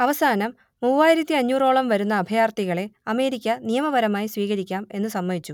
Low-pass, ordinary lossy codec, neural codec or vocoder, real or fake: 19.8 kHz; none; none; real